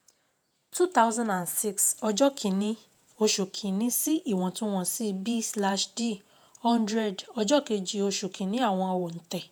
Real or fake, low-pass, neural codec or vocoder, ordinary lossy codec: fake; none; vocoder, 48 kHz, 128 mel bands, Vocos; none